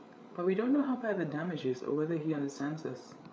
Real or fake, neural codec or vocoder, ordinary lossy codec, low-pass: fake; codec, 16 kHz, 8 kbps, FreqCodec, larger model; none; none